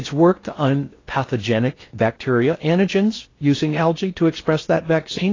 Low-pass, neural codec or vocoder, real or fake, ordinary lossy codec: 7.2 kHz; codec, 16 kHz in and 24 kHz out, 0.6 kbps, FocalCodec, streaming, 4096 codes; fake; AAC, 32 kbps